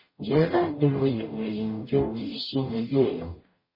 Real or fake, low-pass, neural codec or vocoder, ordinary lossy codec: fake; 5.4 kHz; codec, 44.1 kHz, 0.9 kbps, DAC; MP3, 24 kbps